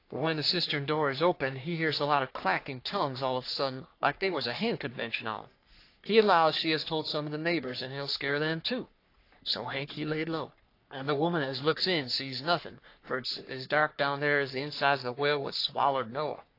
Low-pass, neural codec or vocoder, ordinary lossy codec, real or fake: 5.4 kHz; codec, 44.1 kHz, 3.4 kbps, Pupu-Codec; AAC, 32 kbps; fake